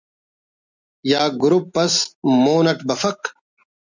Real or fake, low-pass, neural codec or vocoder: real; 7.2 kHz; none